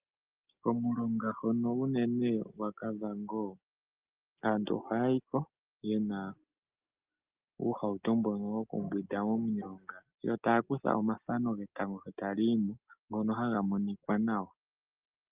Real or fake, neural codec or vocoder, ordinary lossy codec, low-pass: real; none; Opus, 32 kbps; 3.6 kHz